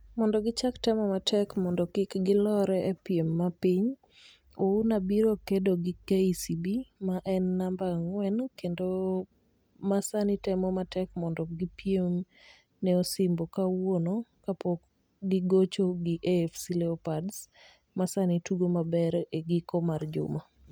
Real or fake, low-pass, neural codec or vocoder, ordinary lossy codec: real; none; none; none